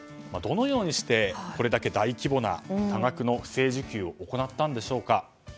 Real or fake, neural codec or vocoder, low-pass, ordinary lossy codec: real; none; none; none